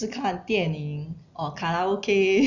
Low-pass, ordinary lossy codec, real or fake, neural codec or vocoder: 7.2 kHz; none; real; none